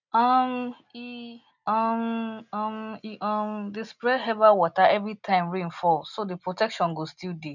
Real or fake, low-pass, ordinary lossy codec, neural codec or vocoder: real; 7.2 kHz; none; none